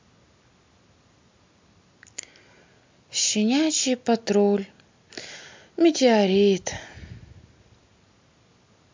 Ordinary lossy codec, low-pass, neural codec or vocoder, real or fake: MP3, 64 kbps; 7.2 kHz; none; real